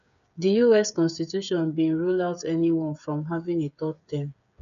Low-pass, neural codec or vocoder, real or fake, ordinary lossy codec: 7.2 kHz; codec, 16 kHz, 8 kbps, FreqCodec, smaller model; fake; none